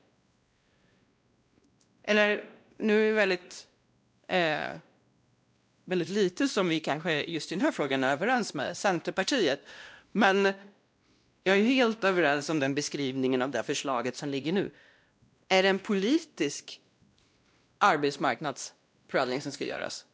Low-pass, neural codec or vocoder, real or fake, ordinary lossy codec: none; codec, 16 kHz, 1 kbps, X-Codec, WavLM features, trained on Multilingual LibriSpeech; fake; none